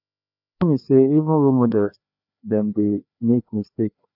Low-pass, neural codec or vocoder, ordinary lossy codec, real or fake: 5.4 kHz; codec, 16 kHz, 2 kbps, FreqCodec, larger model; none; fake